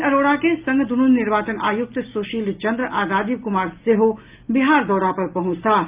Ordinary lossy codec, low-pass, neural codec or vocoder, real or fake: Opus, 32 kbps; 3.6 kHz; none; real